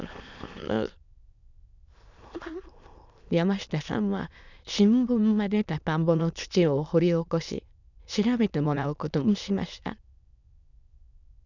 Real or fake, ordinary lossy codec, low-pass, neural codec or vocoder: fake; none; 7.2 kHz; autoencoder, 22.05 kHz, a latent of 192 numbers a frame, VITS, trained on many speakers